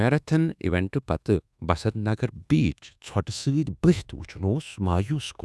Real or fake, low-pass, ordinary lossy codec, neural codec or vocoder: fake; none; none; codec, 24 kHz, 1.2 kbps, DualCodec